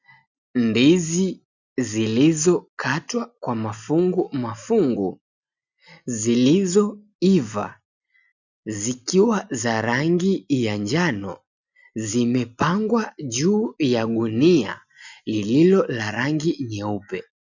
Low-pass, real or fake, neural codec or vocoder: 7.2 kHz; real; none